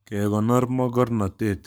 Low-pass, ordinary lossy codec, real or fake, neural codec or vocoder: none; none; fake; codec, 44.1 kHz, 7.8 kbps, Pupu-Codec